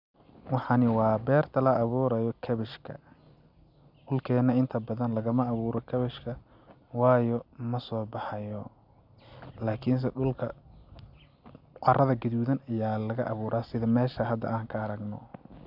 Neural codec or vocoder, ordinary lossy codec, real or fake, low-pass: none; none; real; 5.4 kHz